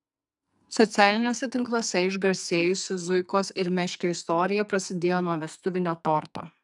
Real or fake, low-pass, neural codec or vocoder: fake; 10.8 kHz; codec, 44.1 kHz, 2.6 kbps, SNAC